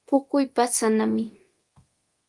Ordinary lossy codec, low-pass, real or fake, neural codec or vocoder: Opus, 24 kbps; 10.8 kHz; fake; codec, 24 kHz, 0.9 kbps, DualCodec